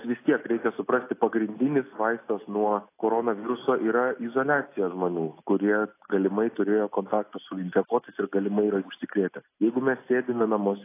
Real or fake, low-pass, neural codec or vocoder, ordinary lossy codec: real; 3.6 kHz; none; AAC, 24 kbps